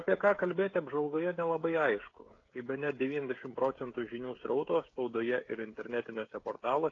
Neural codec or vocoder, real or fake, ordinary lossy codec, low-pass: codec, 16 kHz, 16 kbps, FreqCodec, smaller model; fake; AAC, 32 kbps; 7.2 kHz